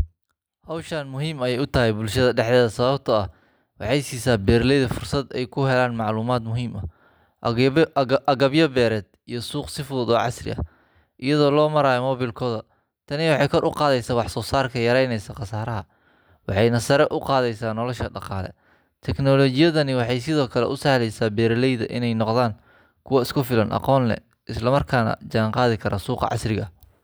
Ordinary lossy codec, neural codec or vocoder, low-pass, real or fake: none; none; none; real